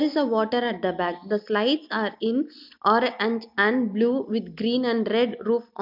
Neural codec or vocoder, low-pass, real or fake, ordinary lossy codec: none; 5.4 kHz; real; MP3, 48 kbps